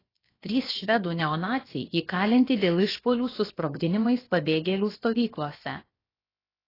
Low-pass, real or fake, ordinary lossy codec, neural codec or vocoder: 5.4 kHz; fake; AAC, 24 kbps; codec, 16 kHz, about 1 kbps, DyCAST, with the encoder's durations